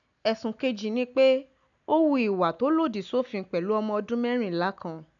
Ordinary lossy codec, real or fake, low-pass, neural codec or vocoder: none; real; 7.2 kHz; none